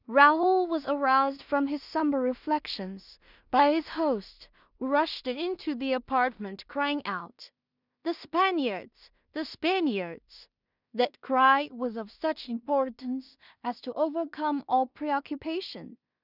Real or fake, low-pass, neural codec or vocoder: fake; 5.4 kHz; codec, 16 kHz in and 24 kHz out, 0.4 kbps, LongCat-Audio-Codec, two codebook decoder